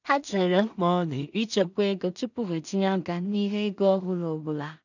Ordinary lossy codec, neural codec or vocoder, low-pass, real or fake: none; codec, 16 kHz in and 24 kHz out, 0.4 kbps, LongCat-Audio-Codec, two codebook decoder; 7.2 kHz; fake